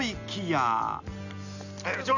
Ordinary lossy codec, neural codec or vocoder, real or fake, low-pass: none; none; real; 7.2 kHz